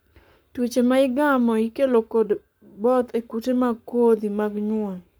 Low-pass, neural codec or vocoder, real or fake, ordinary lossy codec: none; codec, 44.1 kHz, 7.8 kbps, Pupu-Codec; fake; none